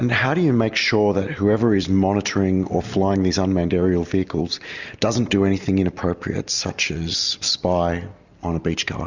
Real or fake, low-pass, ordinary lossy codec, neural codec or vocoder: real; 7.2 kHz; Opus, 64 kbps; none